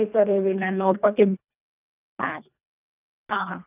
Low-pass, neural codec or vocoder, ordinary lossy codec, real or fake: 3.6 kHz; codec, 24 kHz, 1.5 kbps, HILCodec; none; fake